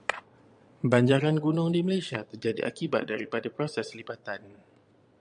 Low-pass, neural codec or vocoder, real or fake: 9.9 kHz; vocoder, 22.05 kHz, 80 mel bands, Vocos; fake